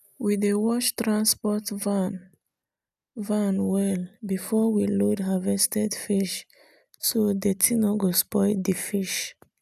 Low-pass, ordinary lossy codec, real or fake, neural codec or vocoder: 14.4 kHz; none; real; none